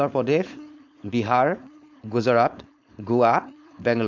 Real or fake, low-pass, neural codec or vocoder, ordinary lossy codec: fake; 7.2 kHz; codec, 16 kHz, 4.8 kbps, FACodec; MP3, 64 kbps